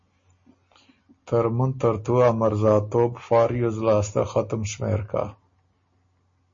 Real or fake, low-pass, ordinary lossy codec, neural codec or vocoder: real; 7.2 kHz; MP3, 32 kbps; none